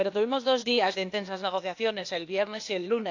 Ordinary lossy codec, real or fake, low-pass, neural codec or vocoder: AAC, 48 kbps; fake; 7.2 kHz; codec, 16 kHz, 0.8 kbps, ZipCodec